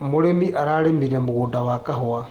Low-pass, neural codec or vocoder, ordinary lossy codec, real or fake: 19.8 kHz; none; Opus, 16 kbps; real